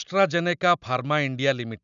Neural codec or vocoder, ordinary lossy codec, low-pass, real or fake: none; none; 7.2 kHz; real